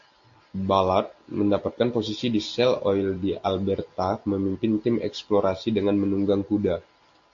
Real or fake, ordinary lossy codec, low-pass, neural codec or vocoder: real; AAC, 48 kbps; 7.2 kHz; none